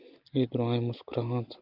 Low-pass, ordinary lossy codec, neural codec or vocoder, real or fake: 5.4 kHz; Opus, 32 kbps; none; real